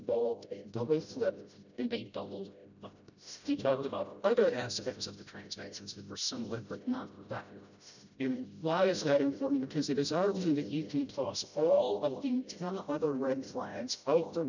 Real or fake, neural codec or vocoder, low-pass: fake; codec, 16 kHz, 0.5 kbps, FreqCodec, smaller model; 7.2 kHz